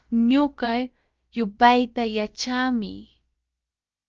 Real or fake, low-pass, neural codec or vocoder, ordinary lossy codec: fake; 7.2 kHz; codec, 16 kHz, about 1 kbps, DyCAST, with the encoder's durations; Opus, 24 kbps